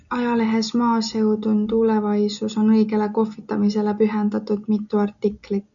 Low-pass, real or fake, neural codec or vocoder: 7.2 kHz; real; none